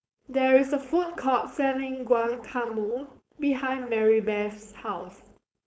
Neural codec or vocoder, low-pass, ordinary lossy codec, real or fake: codec, 16 kHz, 4.8 kbps, FACodec; none; none; fake